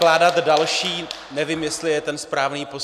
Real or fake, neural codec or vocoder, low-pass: real; none; 14.4 kHz